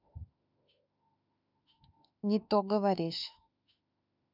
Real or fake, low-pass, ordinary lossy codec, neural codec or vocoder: fake; 5.4 kHz; none; autoencoder, 48 kHz, 32 numbers a frame, DAC-VAE, trained on Japanese speech